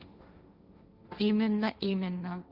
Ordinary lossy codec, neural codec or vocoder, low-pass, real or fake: Opus, 64 kbps; codec, 16 kHz, 1.1 kbps, Voila-Tokenizer; 5.4 kHz; fake